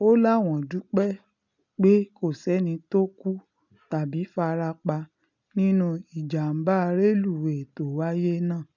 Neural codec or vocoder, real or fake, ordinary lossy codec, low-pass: none; real; none; 7.2 kHz